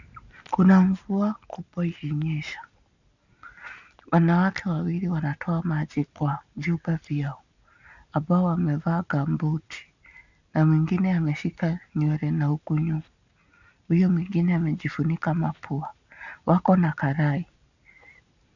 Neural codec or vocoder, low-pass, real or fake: none; 7.2 kHz; real